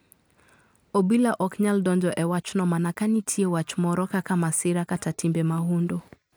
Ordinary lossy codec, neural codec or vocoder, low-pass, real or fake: none; none; none; real